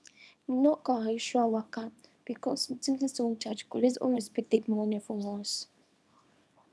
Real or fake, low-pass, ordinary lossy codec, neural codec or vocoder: fake; none; none; codec, 24 kHz, 0.9 kbps, WavTokenizer, small release